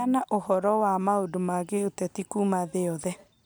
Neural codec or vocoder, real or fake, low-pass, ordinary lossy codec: none; real; none; none